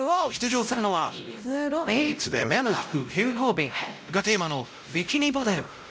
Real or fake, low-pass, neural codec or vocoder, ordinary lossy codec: fake; none; codec, 16 kHz, 0.5 kbps, X-Codec, WavLM features, trained on Multilingual LibriSpeech; none